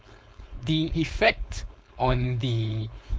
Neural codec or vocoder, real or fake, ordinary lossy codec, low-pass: codec, 16 kHz, 4.8 kbps, FACodec; fake; none; none